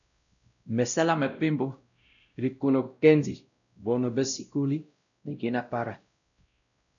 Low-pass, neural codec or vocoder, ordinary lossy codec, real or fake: 7.2 kHz; codec, 16 kHz, 0.5 kbps, X-Codec, WavLM features, trained on Multilingual LibriSpeech; MP3, 96 kbps; fake